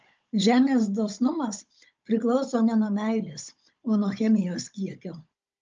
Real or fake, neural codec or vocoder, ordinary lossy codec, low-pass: fake; codec, 16 kHz, 16 kbps, FunCodec, trained on Chinese and English, 50 frames a second; Opus, 24 kbps; 7.2 kHz